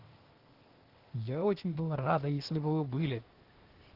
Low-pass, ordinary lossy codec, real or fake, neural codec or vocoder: 5.4 kHz; Opus, 16 kbps; fake; codec, 16 kHz, 0.8 kbps, ZipCodec